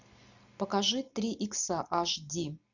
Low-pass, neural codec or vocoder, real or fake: 7.2 kHz; none; real